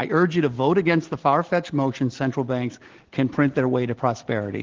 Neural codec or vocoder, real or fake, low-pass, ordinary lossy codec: none; real; 7.2 kHz; Opus, 16 kbps